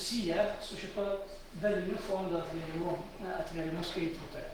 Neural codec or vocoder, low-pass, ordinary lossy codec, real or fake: autoencoder, 48 kHz, 128 numbers a frame, DAC-VAE, trained on Japanese speech; 19.8 kHz; Opus, 16 kbps; fake